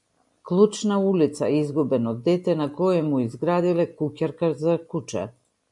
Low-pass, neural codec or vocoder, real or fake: 10.8 kHz; none; real